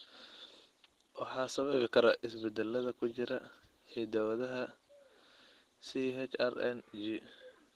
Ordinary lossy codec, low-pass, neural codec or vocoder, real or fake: Opus, 16 kbps; 14.4 kHz; none; real